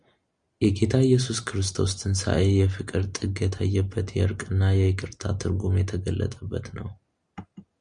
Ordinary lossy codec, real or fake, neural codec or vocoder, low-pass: Opus, 64 kbps; real; none; 9.9 kHz